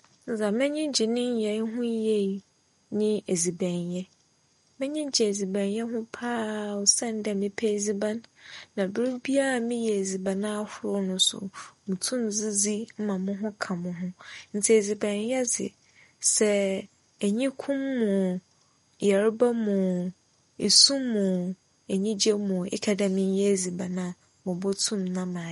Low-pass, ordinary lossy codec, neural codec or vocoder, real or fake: 19.8 kHz; MP3, 48 kbps; none; real